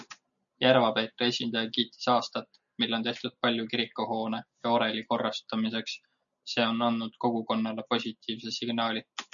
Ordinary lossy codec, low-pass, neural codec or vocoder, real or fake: MP3, 96 kbps; 7.2 kHz; none; real